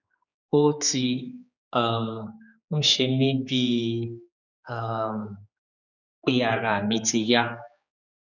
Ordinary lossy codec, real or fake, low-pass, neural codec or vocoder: none; fake; 7.2 kHz; codec, 16 kHz, 4 kbps, X-Codec, HuBERT features, trained on general audio